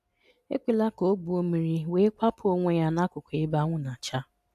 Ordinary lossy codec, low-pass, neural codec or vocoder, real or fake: MP3, 96 kbps; 14.4 kHz; none; real